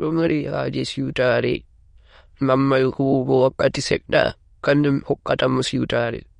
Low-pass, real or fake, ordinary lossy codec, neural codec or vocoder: 9.9 kHz; fake; MP3, 48 kbps; autoencoder, 22.05 kHz, a latent of 192 numbers a frame, VITS, trained on many speakers